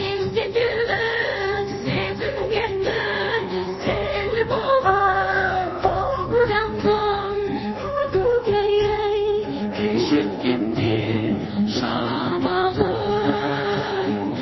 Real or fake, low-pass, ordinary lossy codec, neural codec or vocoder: fake; 7.2 kHz; MP3, 24 kbps; codec, 24 kHz, 1.2 kbps, DualCodec